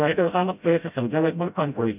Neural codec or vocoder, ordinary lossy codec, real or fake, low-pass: codec, 16 kHz, 0.5 kbps, FreqCodec, smaller model; none; fake; 3.6 kHz